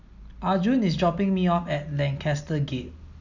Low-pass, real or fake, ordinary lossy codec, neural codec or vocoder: 7.2 kHz; real; none; none